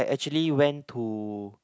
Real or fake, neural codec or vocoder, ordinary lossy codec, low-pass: real; none; none; none